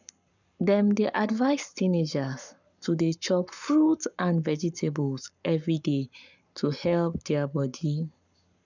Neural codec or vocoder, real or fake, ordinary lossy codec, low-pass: codec, 44.1 kHz, 7.8 kbps, Pupu-Codec; fake; none; 7.2 kHz